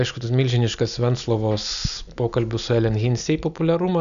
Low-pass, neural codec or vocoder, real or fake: 7.2 kHz; none; real